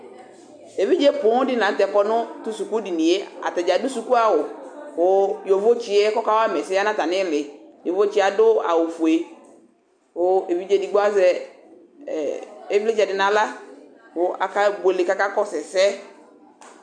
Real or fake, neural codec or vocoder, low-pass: real; none; 9.9 kHz